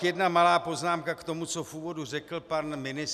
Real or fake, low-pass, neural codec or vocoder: real; 14.4 kHz; none